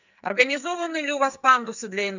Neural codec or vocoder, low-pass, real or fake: codec, 44.1 kHz, 2.6 kbps, SNAC; 7.2 kHz; fake